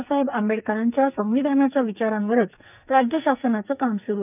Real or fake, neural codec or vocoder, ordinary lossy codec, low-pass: fake; codec, 44.1 kHz, 2.6 kbps, SNAC; none; 3.6 kHz